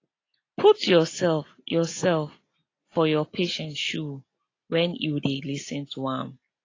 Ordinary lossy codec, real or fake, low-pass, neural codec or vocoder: AAC, 32 kbps; real; 7.2 kHz; none